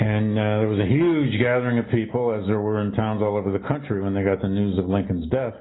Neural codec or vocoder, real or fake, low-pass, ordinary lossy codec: none; real; 7.2 kHz; AAC, 16 kbps